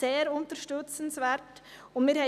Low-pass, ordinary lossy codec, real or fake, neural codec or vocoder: 14.4 kHz; none; real; none